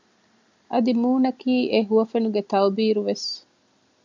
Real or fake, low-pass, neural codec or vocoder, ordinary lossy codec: real; 7.2 kHz; none; MP3, 64 kbps